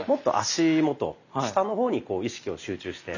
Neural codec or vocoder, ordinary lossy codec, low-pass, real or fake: none; none; 7.2 kHz; real